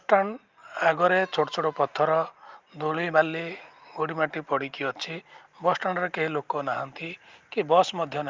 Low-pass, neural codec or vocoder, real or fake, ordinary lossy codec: 7.2 kHz; none; real; Opus, 24 kbps